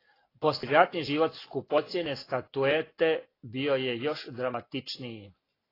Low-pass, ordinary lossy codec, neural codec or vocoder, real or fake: 5.4 kHz; AAC, 24 kbps; none; real